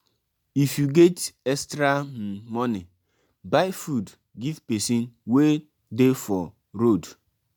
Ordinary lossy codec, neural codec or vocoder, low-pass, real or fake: none; none; none; real